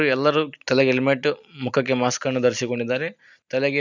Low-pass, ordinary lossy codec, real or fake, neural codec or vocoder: 7.2 kHz; none; real; none